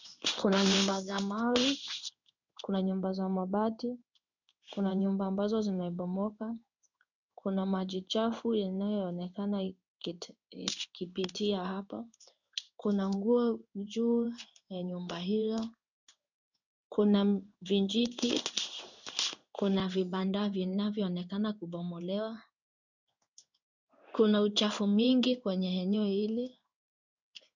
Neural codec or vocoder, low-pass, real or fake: codec, 16 kHz in and 24 kHz out, 1 kbps, XY-Tokenizer; 7.2 kHz; fake